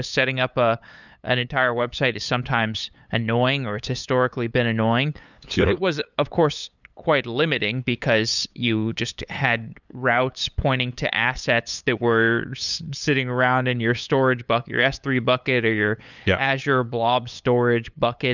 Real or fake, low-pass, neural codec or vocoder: fake; 7.2 kHz; codec, 16 kHz, 8 kbps, FunCodec, trained on LibriTTS, 25 frames a second